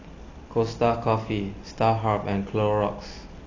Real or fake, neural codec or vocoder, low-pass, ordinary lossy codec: real; none; 7.2 kHz; AAC, 32 kbps